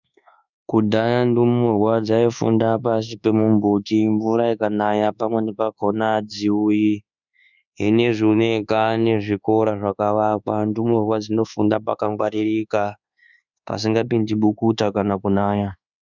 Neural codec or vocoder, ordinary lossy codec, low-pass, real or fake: codec, 24 kHz, 1.2 kbps, DualCodec; Opus, 64 kbps; 7.2 kHz; fake